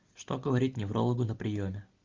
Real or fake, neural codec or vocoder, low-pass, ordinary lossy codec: real; none; 7.2 kHz; Opus, 32 kbps